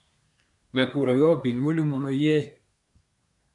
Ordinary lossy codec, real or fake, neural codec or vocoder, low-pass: MP3, 96 kbps; fake; codec, 24 kHz, 1 kbps, SNAC; 10.8 kHz